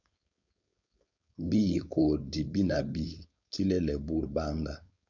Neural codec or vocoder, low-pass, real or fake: codec, 16 kHz, 4.8 kbps, FACodec; 7.2 kHz; fake